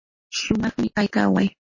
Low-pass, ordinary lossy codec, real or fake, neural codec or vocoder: 7.2 kHz; MP3, 32 kbps; real; none